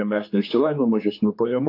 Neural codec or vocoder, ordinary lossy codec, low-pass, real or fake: codec, 16 kHz, 4 kbps, X-Codec, HuBERT features, trained on general audio; AAC, 32 kbps; 5.4 kHz; fake